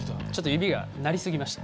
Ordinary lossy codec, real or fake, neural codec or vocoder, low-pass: none; real; none; none